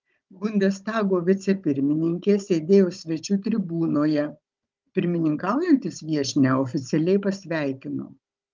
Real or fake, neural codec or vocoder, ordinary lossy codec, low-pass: fake; codec, 16 kHz, 16 kbps, FunCodec, trained on Chinese and English, 50 frames a second; Opus, 32 kbps; 7.2 kHz